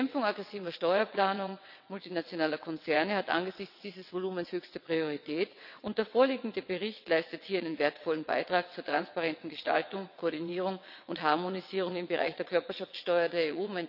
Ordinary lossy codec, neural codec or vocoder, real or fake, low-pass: none; vocoder, 22.05 kHz, 80 mel bands, WaveNeXt; fake; 5.4 kHz